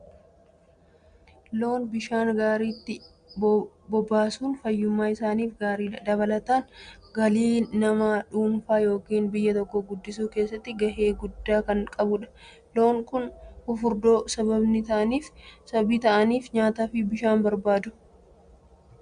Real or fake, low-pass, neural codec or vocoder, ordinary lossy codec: real; 9.9 kHz; none; Opus, 64 kbps